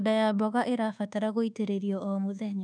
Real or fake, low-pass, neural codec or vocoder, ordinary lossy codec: fake; 9.9 kHz; codec, 24 kHz, 1.2 kbps, DualCodec; none